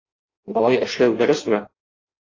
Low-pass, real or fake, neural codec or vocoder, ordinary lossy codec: 7.2 kHz; fake; codec, 16 kHz in and 24 kHz out, 0.6 kbps, FireRedTTS-2 codec; AAC, 32 kbps